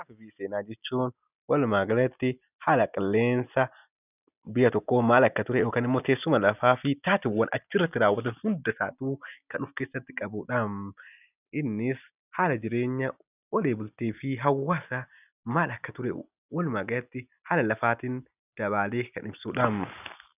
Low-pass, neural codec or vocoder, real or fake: 3.6 kHz; none; real